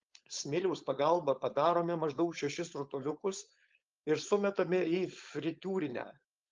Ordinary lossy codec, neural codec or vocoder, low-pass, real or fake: Opus, 24 kbps; codec, 16 kHz, 4.8 kbps, FACodec; 7.2 kHz; fake